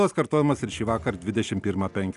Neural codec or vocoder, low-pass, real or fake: none; 10.8 kHz; real